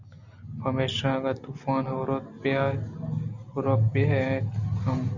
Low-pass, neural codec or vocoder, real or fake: 7.2 kHz; none; real